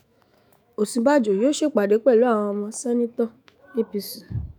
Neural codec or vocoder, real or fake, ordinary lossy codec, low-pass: autoencoder, 48 kHz, 128 numbers a frame, DAC-VAE, trained on Japanese speech; fake; none; none